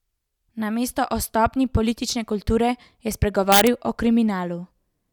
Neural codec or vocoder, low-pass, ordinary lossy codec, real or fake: none; 19.8 kHz; none; real